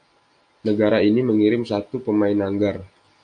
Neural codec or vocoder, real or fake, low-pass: none; real; 9.9 kHz